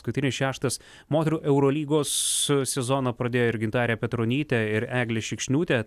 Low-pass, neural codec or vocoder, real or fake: 14.4 kHz; none; real